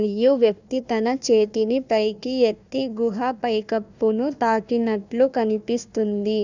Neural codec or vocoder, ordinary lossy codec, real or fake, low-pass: codec, 16 kHz, 1 kbps, FunCodec, trained on Chinese and English, 50 frames a second; none; fake; 7.2 kHz